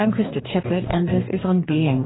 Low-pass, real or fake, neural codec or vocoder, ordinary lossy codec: 7.2 kHz; fake; codec, 44.1 kHz, 2.6 kbps, DAC; AAC, 16 kbps